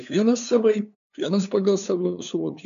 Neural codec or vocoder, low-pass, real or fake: codec, 16 kHz, 2 kbps, FunCodec, trained on LibriTTS, 25 frames a second; 7.2 kHz; fake